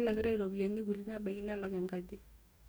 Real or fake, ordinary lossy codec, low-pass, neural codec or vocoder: fake; none; none; codec, 44.1 kHz, 2.6 kbps, DAC